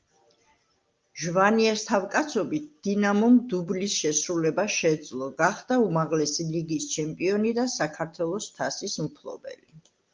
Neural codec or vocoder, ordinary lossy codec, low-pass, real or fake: none; Opus, 24 kbps; 7.2 kHz; real